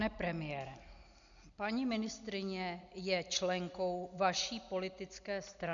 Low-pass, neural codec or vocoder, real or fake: 7.2 kHz; none; real